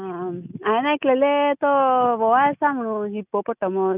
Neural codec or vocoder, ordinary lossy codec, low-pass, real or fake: none; none; 3.6 kHz; real